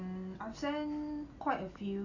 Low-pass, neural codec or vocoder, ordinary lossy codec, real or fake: 7.2 kHz; none; none; real